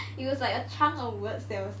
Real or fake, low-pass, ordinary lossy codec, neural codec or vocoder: real; none; none; none